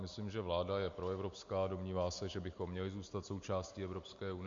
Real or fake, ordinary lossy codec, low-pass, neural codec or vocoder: real; MP3, 48 kbps; 7.2 kHz; none